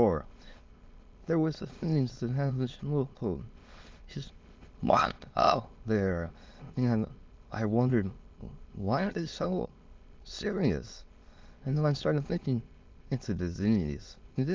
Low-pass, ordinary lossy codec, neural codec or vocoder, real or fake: 7.2 kHz; Opus, 32 kbps; autoencoder, 22.05 kHz, a latent of 192 numbers a frame, VITS, trained on many speakers; fake